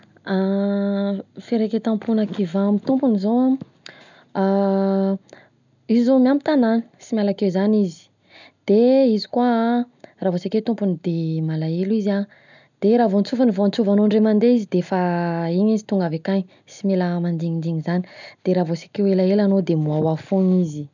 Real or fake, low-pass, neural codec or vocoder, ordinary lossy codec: real; 7.2 kHz; none; none